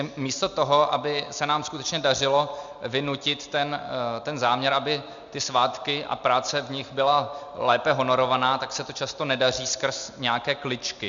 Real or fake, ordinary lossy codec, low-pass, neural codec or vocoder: real; Opus, 64 kbps; 7.2 kHz; none